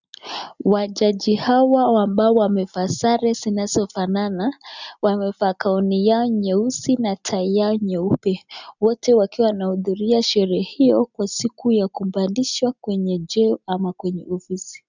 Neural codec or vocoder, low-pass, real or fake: none; 7.2 kHz; real